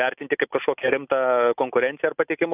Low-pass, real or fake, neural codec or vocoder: 3.6 kHz; real; none